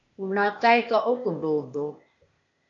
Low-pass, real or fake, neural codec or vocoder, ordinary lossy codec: 7.2 kHz; fake; codec, 16 kHz, 0.8 kbps, ZipCodec; AAC, 48 kbps